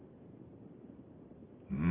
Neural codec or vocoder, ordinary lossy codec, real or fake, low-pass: none; Opus, 24 kbps; real; 3.6 kHz